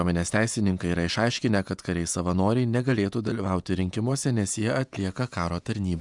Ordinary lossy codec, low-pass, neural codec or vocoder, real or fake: MP3, 96 kbps; 10.8 kHz; vocoder, 48 kHz, 128 mel bands, Vocos; fake